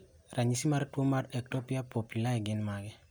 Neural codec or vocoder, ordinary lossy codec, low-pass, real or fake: none; none; none; real